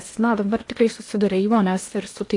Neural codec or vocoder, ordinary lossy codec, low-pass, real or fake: codec, 16 kHz in and 24 kHz out, 0.8 kbps, FocalCodec, streaming, 65536 codes; AAC, 48 kbps; 10.8 kHz; fake